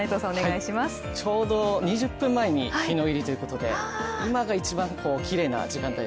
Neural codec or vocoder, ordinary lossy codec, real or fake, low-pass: none; none; real; none